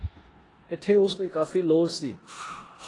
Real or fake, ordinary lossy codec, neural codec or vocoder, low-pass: fake; AAC, 32 kbps; codec, 16 kHz in and 24 kHz out, 0.9 kbps, LongCat-Audio-Codec, four codebook decoder; 10.8 kHz